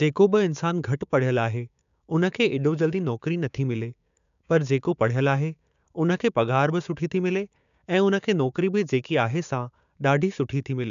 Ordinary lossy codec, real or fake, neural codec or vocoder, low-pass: MP3, 96 kbps; fake; codec, 16 kHz, 6 kbps, DAC; 7.2 kHz